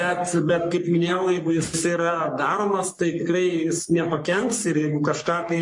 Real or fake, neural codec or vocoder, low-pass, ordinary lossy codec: fake; codec, 44.1 kHz, 3.4 kbps, Pupu-Codec; 10.8 kHz; MP3, 48 kbps